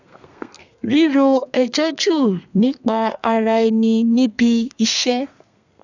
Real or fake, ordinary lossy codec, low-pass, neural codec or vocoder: fake; none; 7.2 kHz; codec, 32 kHz, 1.9 kbps, SNAC